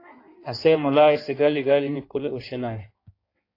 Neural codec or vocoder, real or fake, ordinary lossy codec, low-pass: codec, 16 kHz in and 24 kHz out, 1.1 kbps, FireRedTTS-2 codec; fake; AAC, 32 kbps; 5.4 kHz